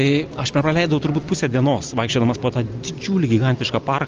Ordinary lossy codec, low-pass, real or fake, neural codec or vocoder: Opus, 24 kbps; 7.2 kHz; real; none